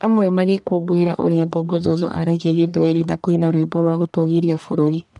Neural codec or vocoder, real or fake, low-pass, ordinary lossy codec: codec, 44.1 kHz, 1.7 kbps, Pupu-Codec; fake; 10.8 kHz; none